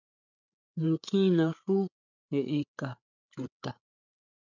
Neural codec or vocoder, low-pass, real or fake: codec, 16 kHz, 8 kbps, FreqCodec, larger model; 7.2 kHz; fake